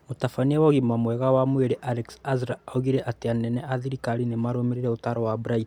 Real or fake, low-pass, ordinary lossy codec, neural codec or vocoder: real; 19.8 kHz; MP3, 96 kbps; none